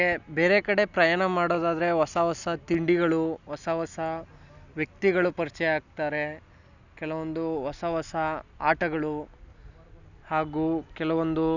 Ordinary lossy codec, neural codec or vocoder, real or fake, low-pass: none; none; real; 7.2 kHz